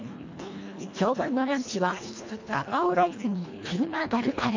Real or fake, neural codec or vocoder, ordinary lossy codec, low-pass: fake; codec, 24 kHz, 1.5 kbps, HILCodec; MP3, 48 kbps; 7.2 kHz